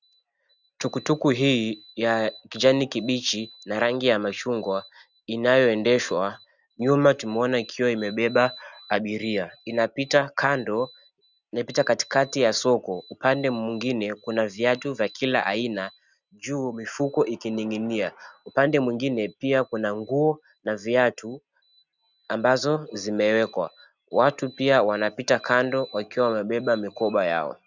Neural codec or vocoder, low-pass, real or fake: none; 7.2 kHz; real